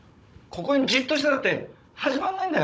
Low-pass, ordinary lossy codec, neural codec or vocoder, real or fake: none; none; codec, 16 kHz, 16 kbps, FunCodec, trained on Chinese and English, 50 frames a second; fake